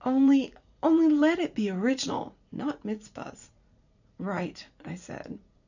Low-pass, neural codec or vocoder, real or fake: 7.2 kHz; vocoder, 44.1 kHz, 80 mel bands, Vocos; fake